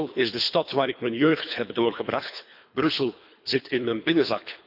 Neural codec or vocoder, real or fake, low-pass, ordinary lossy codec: codec, 24 kHz, 3 kbps, HILCodec; fake; 5.4 kHz; none